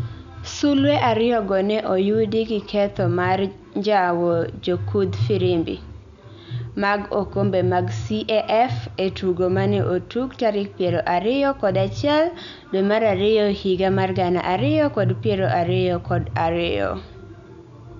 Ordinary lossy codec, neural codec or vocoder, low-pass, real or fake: none; none; 7.2 kHz; real